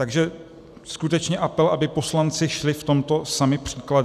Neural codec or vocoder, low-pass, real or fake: none; 14.4 kHz; real